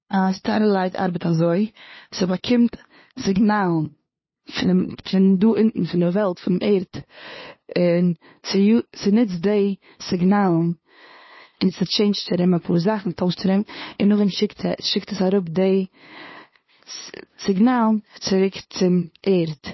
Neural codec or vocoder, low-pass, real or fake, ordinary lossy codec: codec, 16 kHz, 2 kbps, FunCodec, trained on LibriTTS, 25 frames a second; 7.2 kHz; fake; MP3, 24 kbps